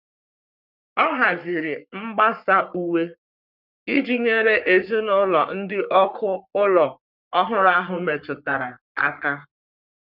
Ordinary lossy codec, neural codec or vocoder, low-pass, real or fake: none; codec, 44.1 kHz, 3.4 kbps, Pupu-Codec; 5.4 kHz; fake